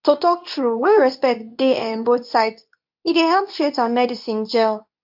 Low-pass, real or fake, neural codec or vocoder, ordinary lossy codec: 5.4 kHz; fake; codec, 24 kHz, 0.9 kbps, WavTokenizer, medium speech release version 2; AAC, 48 kbps